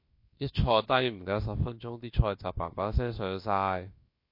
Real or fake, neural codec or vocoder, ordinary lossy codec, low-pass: fake; codec, 16 kHz, about 1 kbps, DyCAST, with the encoder's durations; MP3, 32 kbps; 5.4 kHz